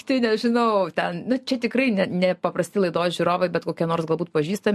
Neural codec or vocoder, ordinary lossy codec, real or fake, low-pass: none; MP3, 64 kbps; real; 14.4 kHz